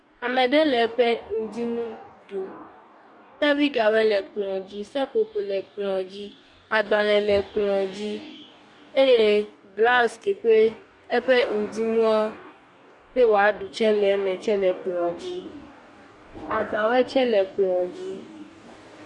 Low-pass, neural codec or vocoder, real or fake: 10.8 kHz; codec, 44.1 kHz, 2.6 kbps, DAC; fake